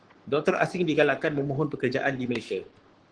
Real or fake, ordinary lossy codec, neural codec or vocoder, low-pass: fake; Opus, 16 kbps; codec, 44.1 kHz, 7.8 kbps, Pupu-Codec; 9.9 kHz